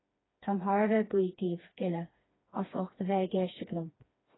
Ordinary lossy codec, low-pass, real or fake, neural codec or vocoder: AAC, 16 kbps; 7.2 kHz; fake; codec, 16 kHz, 2 kbps, FreqCodec, smaller model